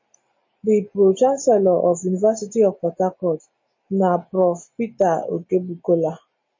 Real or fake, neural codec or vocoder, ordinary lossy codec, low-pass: real; none; MP3, 32 kbps; 7.2 kHz